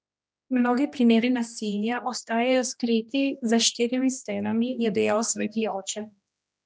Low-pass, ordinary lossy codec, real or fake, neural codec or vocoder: none; none; fake; codec, 16 kHz, 1 kbps, X-Codec, HuBERT features, trained on general audio